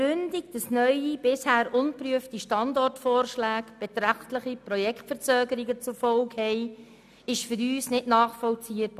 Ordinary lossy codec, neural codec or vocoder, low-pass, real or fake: none; none; 14.4 kHz; real